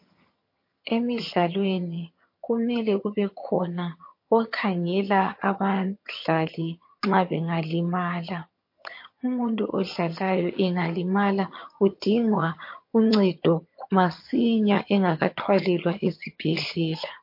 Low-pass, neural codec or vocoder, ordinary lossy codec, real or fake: 5.4 kHz; vocoder, 22.05 kHz, 80 mel bands, HiFi-GAN; MP3, 32 kbps; fake